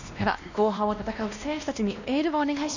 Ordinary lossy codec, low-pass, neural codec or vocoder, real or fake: none; 7.2 kHz; codec, 16 kHz, 1 kbps, X-Codec, WavLM features, trained on Multilingual LibriSpeech; fake